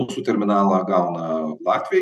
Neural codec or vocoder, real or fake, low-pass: none; real; 14.4 kHz